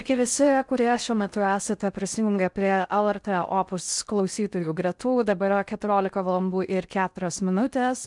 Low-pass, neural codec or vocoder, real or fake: 10.8 kHz; codec, 16 kHz in and 24 kHz out, 0.6 kbps, FocalCodec, streaming, 4096 codes; fake